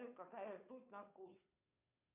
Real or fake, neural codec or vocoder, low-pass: fake; codec, 16 kHz, 2 kbps, FunCodec, trained on Chinese and English, 25 frames a second; 3.6 kHz